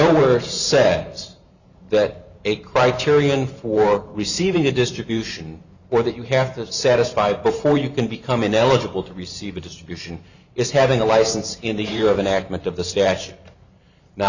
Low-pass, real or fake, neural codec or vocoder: 7.2 kHz; fake; vocoder, 44.1 kHz, 128 mel bands every 512 samples, BigVGAN v2